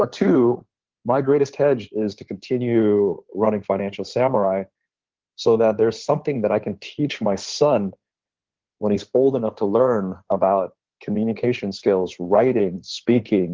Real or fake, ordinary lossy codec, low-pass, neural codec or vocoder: fake; Opus, 16 kbps; 7.2 kHz; codec, 16 kHz in and 24 kHz out, 2.2 kbps, FireRedTTS-2 codec